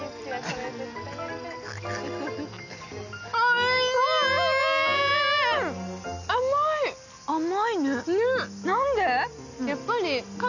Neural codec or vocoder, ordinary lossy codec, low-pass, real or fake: none; none; 7.2 kHz; real